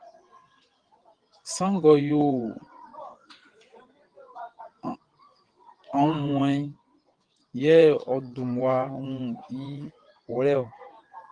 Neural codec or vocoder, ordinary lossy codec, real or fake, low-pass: vocoder, 22.05 kHz, 80 mel bands, WaveNeXt; Opus, 24 kbps; fake; 9.9 kHz